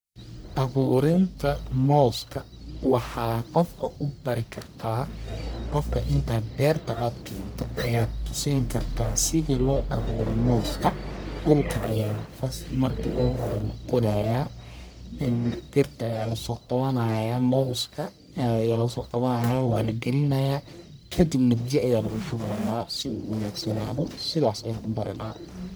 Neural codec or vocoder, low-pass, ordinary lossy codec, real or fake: codec, 44.1 kHz, 1.7 kbps, Pupu-Codec; none; none; fake